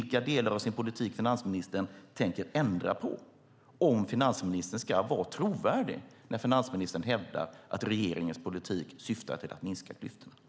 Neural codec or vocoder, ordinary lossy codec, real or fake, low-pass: none; none; real; none